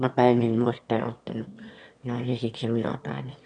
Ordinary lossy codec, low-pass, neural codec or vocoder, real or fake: none; 9.9 kHz; autoencoder, 22.05 kHz, a latent of 192 numbers a frame, VITS, trained on one speaker; fake